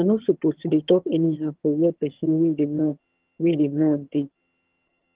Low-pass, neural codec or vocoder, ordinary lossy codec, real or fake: 3.6 kHz; vocoder, 22.05 kHz, 80 mel bands, HiFi-GAN; Opus, 16 kbps; fake